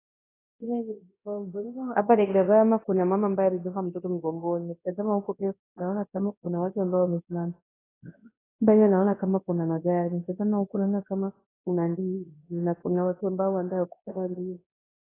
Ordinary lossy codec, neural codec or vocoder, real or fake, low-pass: AAC, 16 kbps; codec, 24 kHz, 0.9 kbps, WavTokenizer, large speech release; fake; 3.6 kHz